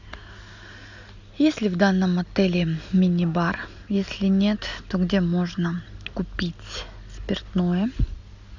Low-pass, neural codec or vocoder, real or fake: 7.2 kHz; none; real